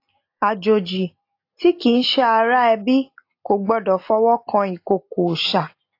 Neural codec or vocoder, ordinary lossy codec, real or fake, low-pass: none; AAC, 32 kbps; real; 5.4 kHz